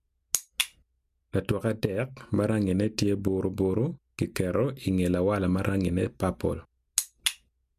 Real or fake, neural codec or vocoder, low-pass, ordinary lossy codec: real; none; 14.4 kHz; MP3, 96 kbps